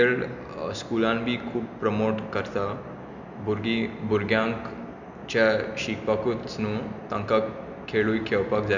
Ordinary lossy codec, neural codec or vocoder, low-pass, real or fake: none; none; 7.2 kHz; real